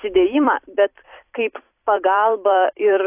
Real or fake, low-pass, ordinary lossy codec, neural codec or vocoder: fake; 3.6 kHz; AAC, 24 kbps; vocoder, 44.1 kHz, 128 mel bands every 256 samples, BigVGAN v2